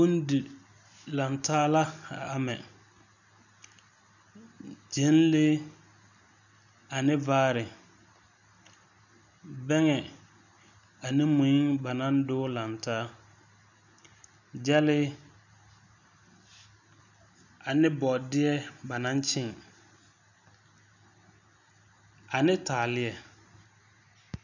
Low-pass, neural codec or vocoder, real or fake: 7.2 kHz; none; real